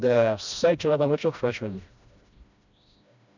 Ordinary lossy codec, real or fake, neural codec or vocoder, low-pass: none; fake; codec, 16 kHz, 1 kbps, FreqCodec, smaller model; 7.2 kHz